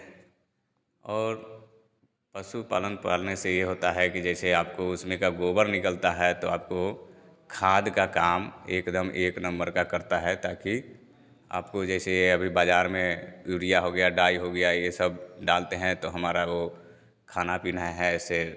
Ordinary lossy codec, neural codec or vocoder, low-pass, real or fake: none; none; none; real